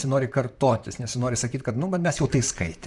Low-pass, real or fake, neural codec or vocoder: 10.8 kHz; fake; vocoder, 44.1 kHz, 128 mel bands, Pupu-Vocoder